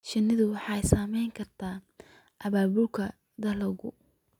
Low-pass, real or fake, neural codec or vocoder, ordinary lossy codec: 19.8 kHz; real; none; none